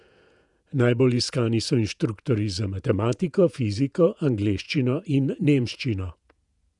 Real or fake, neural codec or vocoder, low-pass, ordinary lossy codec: fake; vocoder, 48 kHz, 128 mel bands, Vocos; 10.8 kHz; none